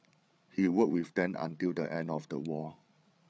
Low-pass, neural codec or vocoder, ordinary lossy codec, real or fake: none; codec, 16 kHz, 8 kbps, FreqCodec, larger model; none; fake